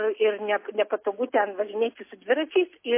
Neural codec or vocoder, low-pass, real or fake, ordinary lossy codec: none; 3.6 kHz; real; MP3, 24 kbps